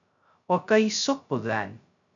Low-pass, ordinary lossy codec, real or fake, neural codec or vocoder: 7.2 kHz; MP3, 96 kbps; fake; codec, 16 kHz, 0.2 kbps, FocalCodec